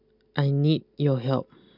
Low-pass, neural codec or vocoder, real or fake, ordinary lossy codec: 5.4 kHz; none; real; none